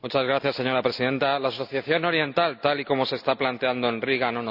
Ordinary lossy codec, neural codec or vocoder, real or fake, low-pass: none; none; real; 5.4 kHz